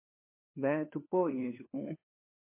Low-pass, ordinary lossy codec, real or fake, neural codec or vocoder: 3.6 kHz; MP3, 24 kbps; fake; codec, 16 kHz, 4 kbps, FunCodec, trained on LibriTTS, 50 frames a second